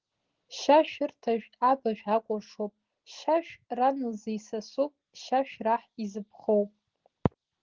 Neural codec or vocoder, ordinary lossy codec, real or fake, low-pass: none; Opus, 16 kbps; real; 7.2 kHz